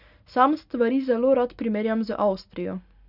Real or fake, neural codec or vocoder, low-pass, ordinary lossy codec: real; none; 5.4 kHz; none